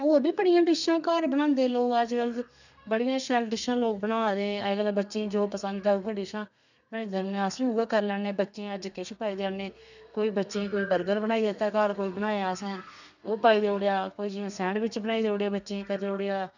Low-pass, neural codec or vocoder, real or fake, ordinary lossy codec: 7.2 kHz; codec, 32 kHz, 1.9 kbps, SNAC; fake; none